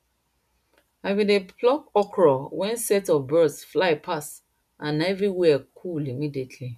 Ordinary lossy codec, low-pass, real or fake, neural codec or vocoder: none; 14.4 kHz; real; none